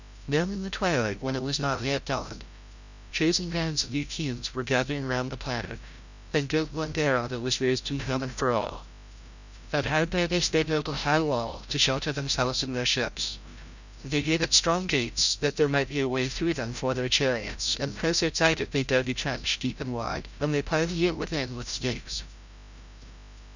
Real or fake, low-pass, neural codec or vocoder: fake; 7.2 kHz; codec, 16 kHz, 0.5 kbps, FreqCodec, larger model